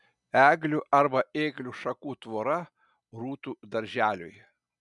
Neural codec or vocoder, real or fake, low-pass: none; real; 10.8 kHz